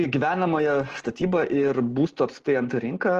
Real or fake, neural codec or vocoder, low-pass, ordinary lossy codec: real; none; 14.4 kHz; Opus, 16 kbps